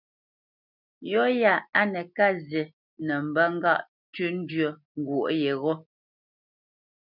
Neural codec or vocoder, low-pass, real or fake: none; 5.4 kHz; real